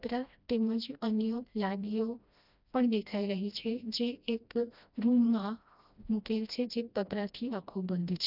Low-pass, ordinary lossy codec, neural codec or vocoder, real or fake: 5.4 kHz; none; codec, 16 kHz, 1 kbps, FreqCodec, smaller model; fake